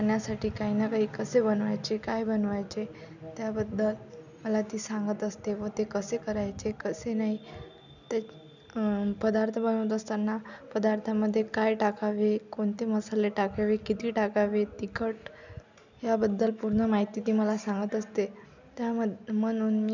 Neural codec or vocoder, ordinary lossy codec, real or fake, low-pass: none; none; real; 7.2 kHz